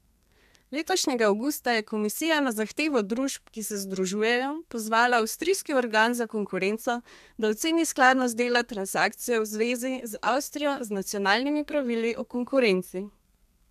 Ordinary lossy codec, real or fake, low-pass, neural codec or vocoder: MP3, 96 kbps; fake; 14.4 kHz; codec, 32 kHz, 1.9 kbps, SNAC